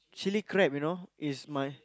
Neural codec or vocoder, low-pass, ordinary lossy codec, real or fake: none; none; none; real